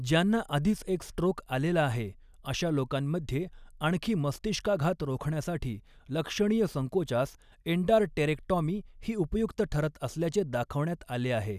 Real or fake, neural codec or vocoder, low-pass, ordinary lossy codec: real; none; 14.4 kHz; none